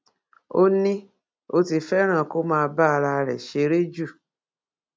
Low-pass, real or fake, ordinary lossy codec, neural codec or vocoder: none; real; none; none